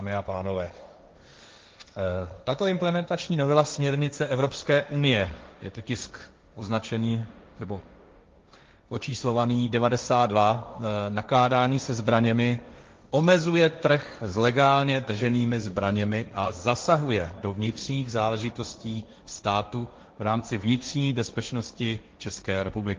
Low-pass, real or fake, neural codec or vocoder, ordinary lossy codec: 7.2 kHz; fake; codec, 16 kHz, 1.1 kbps, Voila-Tokenizer; Opus, 32 kbps